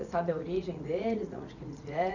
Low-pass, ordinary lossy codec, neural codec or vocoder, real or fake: 7.2 kHz; none; vocoder, 44.1 kHz, 80 mel bands, Vocos; fake